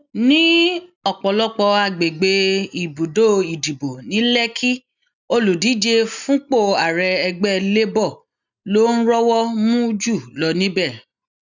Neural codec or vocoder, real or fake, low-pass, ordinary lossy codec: none; real; 7.2 kHz; none